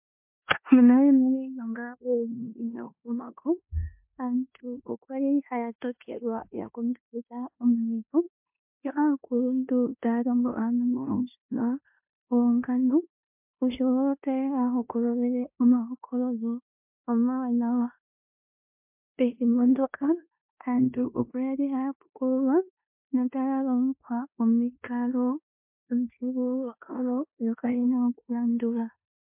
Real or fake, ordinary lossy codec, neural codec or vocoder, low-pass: fake; MP3, 32 kbps; codec, 16 kHz in and 24 kHz out, 0.9 kbps, LongCat-Audio-Codec, four codebook decoder; 3.6 kHz